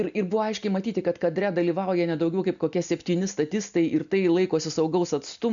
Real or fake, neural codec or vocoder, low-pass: real; none; 7.2 kHz